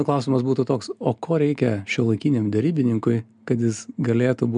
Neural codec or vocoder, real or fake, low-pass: none; real; 9.9 kHz